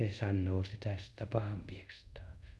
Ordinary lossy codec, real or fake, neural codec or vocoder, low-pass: none; fake; codec, 24 kHz, 0.5 kbps, DualCodec; none